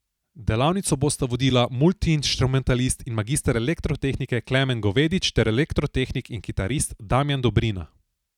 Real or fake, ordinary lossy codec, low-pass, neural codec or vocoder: real; none; 19.8 kHz; none